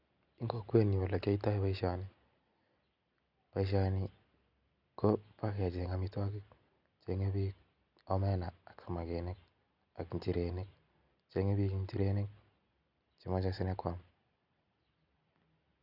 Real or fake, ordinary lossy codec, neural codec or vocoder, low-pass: real; none; none; 5.4 kHz